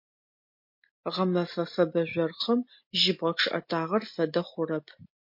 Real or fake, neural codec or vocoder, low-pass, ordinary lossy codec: real; none; 5.4 kHz; MP3, 32 kbps